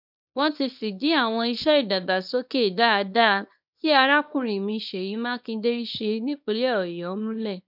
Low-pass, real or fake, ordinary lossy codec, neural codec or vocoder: 5.4 kHz; fake; none; codec, 24 kHz, 0.9 kbps, WavTokenizer, small release